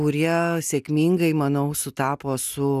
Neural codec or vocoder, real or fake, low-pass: none; real; 14.4 kHz